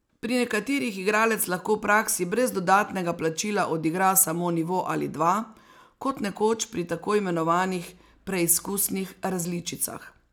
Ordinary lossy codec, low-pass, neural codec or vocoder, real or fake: none; none; none; real